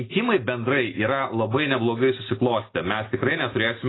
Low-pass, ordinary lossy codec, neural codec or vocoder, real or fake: 7.2 kHz; AAC, 16 kbps; none; real